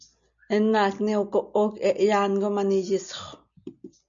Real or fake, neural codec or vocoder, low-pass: real; none; 7.2 kHz